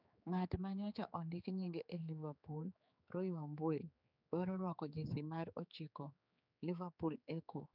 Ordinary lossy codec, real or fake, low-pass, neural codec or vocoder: none; fake; 5.4 kHz; codec, 16 kHz, 4 kbps, X-Codec, HuBERT features, trained on general audio